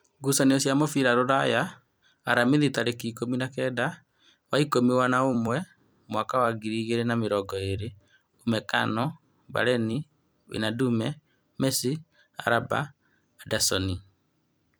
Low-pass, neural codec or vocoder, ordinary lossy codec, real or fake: none; vocoder, 44.1 kHz, 128 mel bands every 256 samples, BigVGAN v2; none; fake